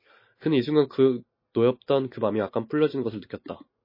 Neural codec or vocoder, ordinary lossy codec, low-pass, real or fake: none; MP3, 24 kbps; 5.4 kHz; real